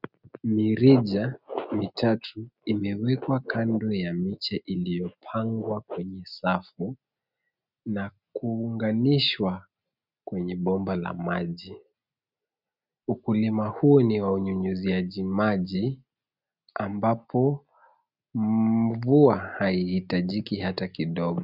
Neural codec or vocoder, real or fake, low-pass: none; real; 5.4 kHz